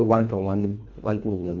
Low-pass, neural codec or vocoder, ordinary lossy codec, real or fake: 7.2 kHz; codec, 24 kHz, 1.5 kbps, HILCodec; none; fake